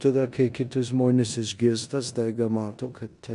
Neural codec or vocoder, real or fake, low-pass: codec, 16 kHz in and 24 kHz out, 0.9 kbps, LongCat-Audio-Codec, four codebook decoder; fake; 10.8 kHz